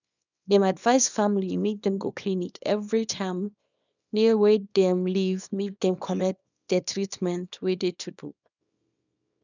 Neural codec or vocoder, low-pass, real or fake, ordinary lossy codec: codec, 24 kHz, 0.9 kbps, WavTokenizer, small release; 7.2 kHz; fake; none